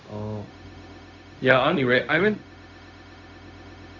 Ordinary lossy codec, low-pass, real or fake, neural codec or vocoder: MP3, 64 kbps; 7.2 kHz; fake; codec, 16 kHz, 0.4 kbps, LongCat-Audio-Codec